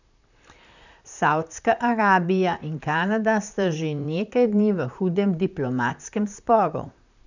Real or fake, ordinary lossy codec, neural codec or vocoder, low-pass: fake; none; vocoder, 44.1 kHz, 128 mel bands, Pupu-Vocoder; 7.2 kHz